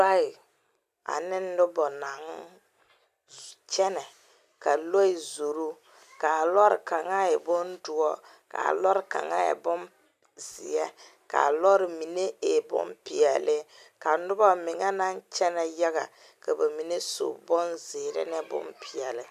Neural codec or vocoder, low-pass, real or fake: none; 14.4 kHz; real